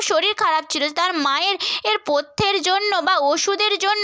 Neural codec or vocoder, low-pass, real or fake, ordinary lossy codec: none; none; real; none